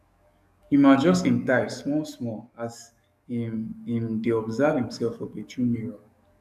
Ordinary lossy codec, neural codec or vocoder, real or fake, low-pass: none; codec, 44.1 kHz, 7.8 kbps, DAC; fake; 14.4 kHz